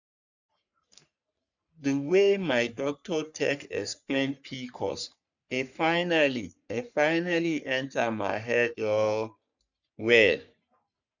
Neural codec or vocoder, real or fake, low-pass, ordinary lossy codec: codec, 44.1 kHz, 3.4 kbps, Pupu-Codec; fake; 7.2 kHz; none